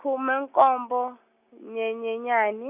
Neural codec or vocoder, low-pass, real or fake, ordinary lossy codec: none; 3.6 kHz; real; none